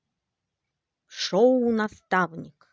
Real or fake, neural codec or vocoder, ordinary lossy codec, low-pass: real; none; none; none